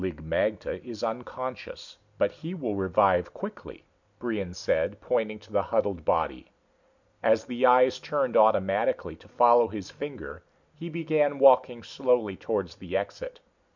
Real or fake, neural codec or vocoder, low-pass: real; none; 7.2 kHz